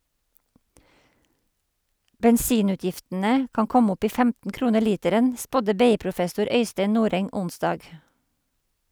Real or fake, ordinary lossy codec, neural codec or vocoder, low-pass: real; none; none; none